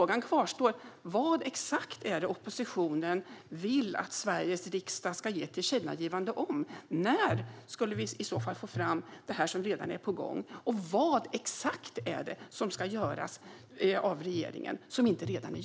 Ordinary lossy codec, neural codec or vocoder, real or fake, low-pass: none; none; real; none